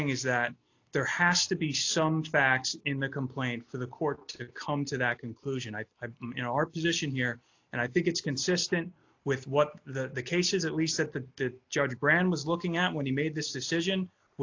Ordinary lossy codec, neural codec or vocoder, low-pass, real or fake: AAC, 48 kbps; none; 7.2 kHz; real